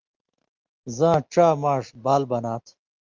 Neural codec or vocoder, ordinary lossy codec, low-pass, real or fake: none; Opus, 24 kbps; 7.2 kHz; real